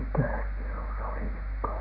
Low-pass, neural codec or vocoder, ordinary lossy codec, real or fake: 5.4 kHz; none; none; real